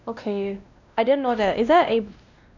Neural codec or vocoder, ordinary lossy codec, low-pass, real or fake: codec, 16 kHz, 1 kbps, X-Codec, WavLM features, trained on Multilingual LibriSpeech; none; 7.2 kHz; fake